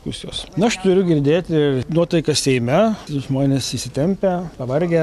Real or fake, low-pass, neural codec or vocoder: real; 14.4 kHz; none